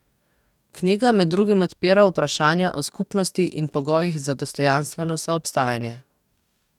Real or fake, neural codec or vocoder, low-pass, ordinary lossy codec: fake; codec, 44.1 kHz, 2.6 kbps, DAC; 19.8 kHz; none